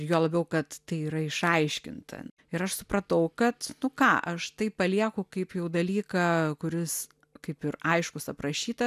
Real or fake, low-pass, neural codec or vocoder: real; 14.4 kHz; none